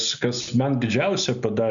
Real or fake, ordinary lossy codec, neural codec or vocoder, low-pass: real; MP3, 96 kbps; none; 7.2 kHz